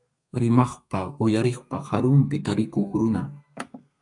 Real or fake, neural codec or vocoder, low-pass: fake; codec, 32 kHz, 1.9 kbps, SNAC; 10.8 kHz